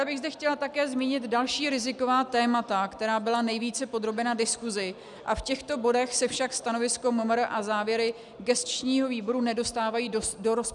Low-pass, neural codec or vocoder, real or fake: 10.8 kHz; none; real